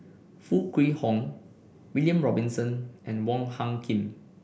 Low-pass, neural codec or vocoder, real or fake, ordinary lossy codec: none; none; real; none